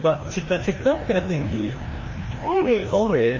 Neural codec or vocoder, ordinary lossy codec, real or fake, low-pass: codec, 16 kHz, 1 kbps, FreqCodec, larger model; MP3, 32 kbps; fake; 7.2 kHz